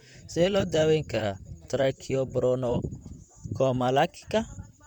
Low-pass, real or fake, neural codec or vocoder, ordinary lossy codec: 19.8 kHz; fake; vocoder, 44.1 kHz, 128 mel bands, Pupu-Vocoder; none